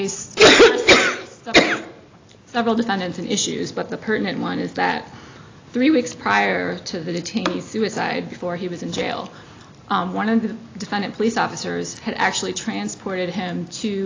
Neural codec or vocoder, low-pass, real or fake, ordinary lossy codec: none; 7.2 kHz; real; AAC, 32 kbps